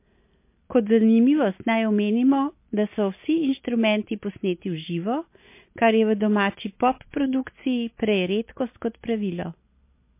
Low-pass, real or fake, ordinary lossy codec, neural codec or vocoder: 3.6 kHz; real; MP3, 24 kbps; none